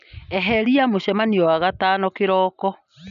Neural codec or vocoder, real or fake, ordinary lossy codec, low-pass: none; real; none; 7.2 kHz